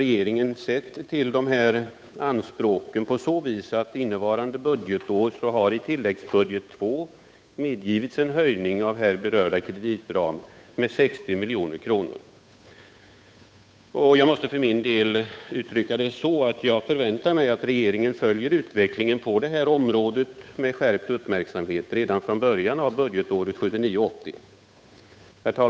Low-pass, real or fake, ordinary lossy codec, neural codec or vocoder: none; fake; none; codec, 16 kHz, 8 kbps, FunCodec, trained on Chinese and English, 25 frames a second